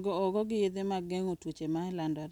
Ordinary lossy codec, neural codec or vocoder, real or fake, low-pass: none; none; real; 19.8 kHz